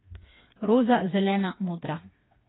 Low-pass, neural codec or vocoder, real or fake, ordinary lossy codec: 7.2 kHz; codec, 16 kHz, 4 kbps, FreqCodec, smaller model; fake; AAC, 16 kbps